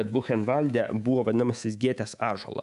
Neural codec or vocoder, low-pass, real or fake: codec, 24 kHz, 3.1 kbps, DualCodec; 10.8 kHz; fake